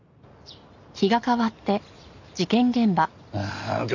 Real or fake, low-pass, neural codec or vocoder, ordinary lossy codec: real; 7.2 kHz; none; none